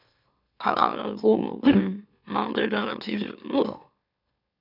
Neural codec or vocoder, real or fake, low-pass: autoencoder, 44.1 kHz, a latent of 192 numbers a frame, MeloTTS; fake; 5.4 kHz